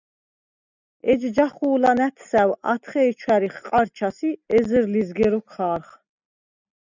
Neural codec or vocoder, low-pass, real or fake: none; 7.2 kHz; real